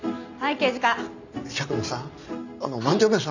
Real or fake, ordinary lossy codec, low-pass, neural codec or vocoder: real; none; 7.2 kHz; none